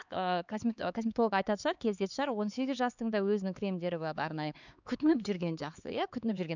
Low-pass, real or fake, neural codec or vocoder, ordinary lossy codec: 7.2 kHz; fake; codec, 16 kHz, 4 kbps, X-Codec, HuBERT features, trained on LibriSpeech; none